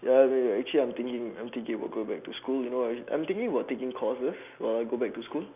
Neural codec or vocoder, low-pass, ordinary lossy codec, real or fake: none; 3.6 kHz; none; real